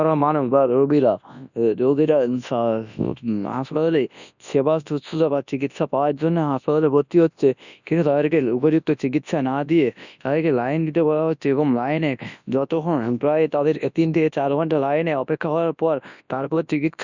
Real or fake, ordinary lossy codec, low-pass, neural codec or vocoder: fake; none; 7.2 kHz; codec, 24 kHz, 0.9 kbps, WavTokenizer, large speech release